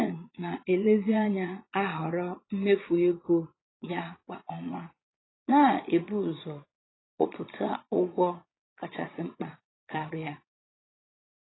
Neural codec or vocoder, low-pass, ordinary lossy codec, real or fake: vocoder, 22.05 kHz, 80 mel bands, Vocos; 7.2 kHz; AAC, 16 kbps; fake